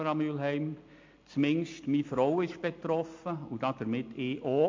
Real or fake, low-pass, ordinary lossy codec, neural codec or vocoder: real; 7.2 kHz; MP3, 48 kbps; none